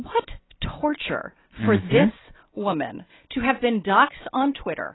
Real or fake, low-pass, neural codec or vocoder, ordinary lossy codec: real; 7.2 kHz; none; AAC, 16 kbps